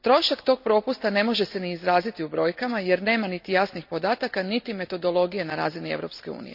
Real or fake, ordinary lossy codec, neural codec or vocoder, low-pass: real; none; none; 5.4 kHz